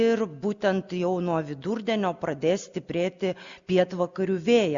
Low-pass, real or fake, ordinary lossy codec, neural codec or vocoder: 7.2 kHz; real; Opus, 64 kbps; none